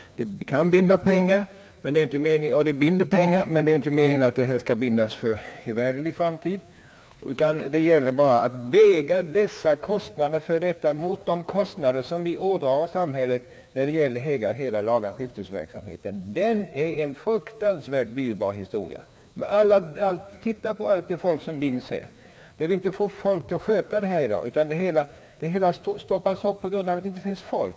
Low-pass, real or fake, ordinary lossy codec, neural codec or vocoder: none; fake; none; codec, 16 kHz, 2 kbps, FreqCodec, larger model